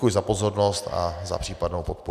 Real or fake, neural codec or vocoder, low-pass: real; none; 14.4 kHz